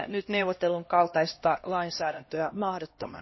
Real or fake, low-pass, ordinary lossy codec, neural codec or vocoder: fake; 7.2 kHz; MP3, 24 kbps; codec, 16 kHz, 1 kbps, X-Codec, HuBERT features, trained on LibriSpeech